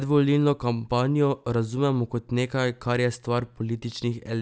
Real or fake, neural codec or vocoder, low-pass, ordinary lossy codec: real; none; none; none